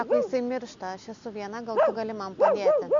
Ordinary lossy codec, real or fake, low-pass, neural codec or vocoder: MP3, 64 kbps; real; 7.2 kHz; none